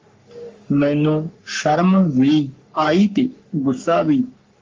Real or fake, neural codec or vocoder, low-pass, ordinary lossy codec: fake; codec, 44.1 kHz, 3.4 kbps, Pupu-Codec; 7.2 kHz; Opus, 32 kbps